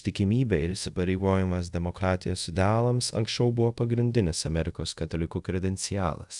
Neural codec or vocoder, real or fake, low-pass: codec, 24 kHz, 0.5 kbps, DualCodec; fake; 10.8 kHz